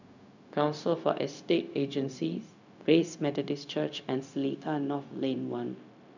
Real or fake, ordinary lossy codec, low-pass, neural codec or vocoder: fake; none; 7.2 kHz; codec, 16 kHz, 0.4 kbps, LongCat-Audio-Codec